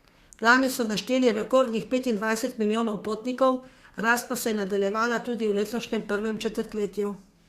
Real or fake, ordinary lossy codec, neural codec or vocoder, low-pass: fake; Opus, 64 kbps; codec, 32 kHz, 1.9 kbps, SNAC; 14.4 kHz